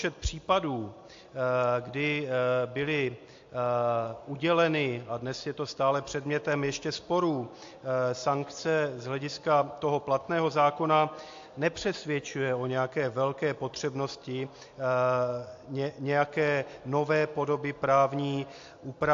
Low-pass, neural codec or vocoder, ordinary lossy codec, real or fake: 7.2 kHz; none; AAC, 48 kbps; real